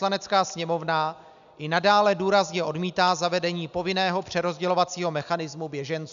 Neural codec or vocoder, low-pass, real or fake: none; 7.2 kHz; real